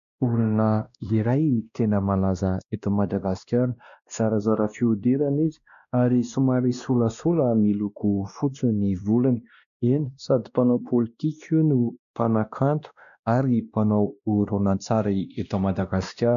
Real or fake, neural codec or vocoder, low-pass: fake; codec, 16 kHz, 1 kbps, X-Codec, WavLM features, trained on Multilingual LibriSpeech; 7.2 kHz